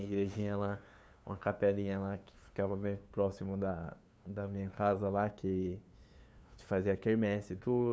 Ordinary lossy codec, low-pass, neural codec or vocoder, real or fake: none; none; codec, 16 kHz, 2 kbps, FunCodec, trained on LibriTTS, 25 frames a second; fake